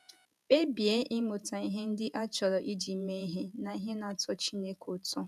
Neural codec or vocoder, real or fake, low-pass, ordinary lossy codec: vocoder, 48 kHz, 128 mel bands, Vocos; fake; 14.4 kHz; none